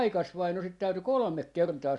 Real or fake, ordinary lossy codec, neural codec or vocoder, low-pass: real; Opus, 64 kbps; none; 10.8 kHz